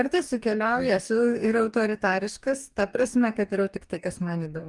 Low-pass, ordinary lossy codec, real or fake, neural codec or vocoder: 10.8 kHz; Opus, 32 kbps; fake; codec, 44.1 kHz, 2.6 kbps, DAC